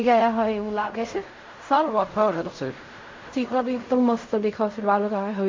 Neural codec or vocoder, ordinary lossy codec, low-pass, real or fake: codec, 16 kHz in and 24 kHz out, 0.4 kbps, LongCat-Audio-Codec, fine tuned four codebook decoder; MP3, 48 kbps; 7.2 kHz; fake